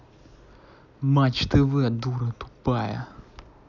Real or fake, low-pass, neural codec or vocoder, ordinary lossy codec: real; 7.2 kHz; none; none